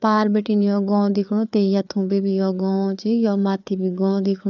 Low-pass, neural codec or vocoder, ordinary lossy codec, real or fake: 7.2 kHz; codec, 16 kHz, 4 kbps, FreqCodec, larger model; none; fake